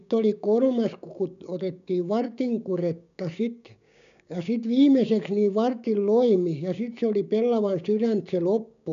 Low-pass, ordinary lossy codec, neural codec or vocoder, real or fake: 7.2 kHz; none; codec, 16 kHz, 6 kbps, DAC; fake